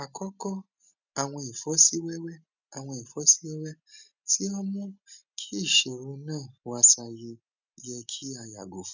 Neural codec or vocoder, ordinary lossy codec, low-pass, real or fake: none; none; 7.2 kHz; real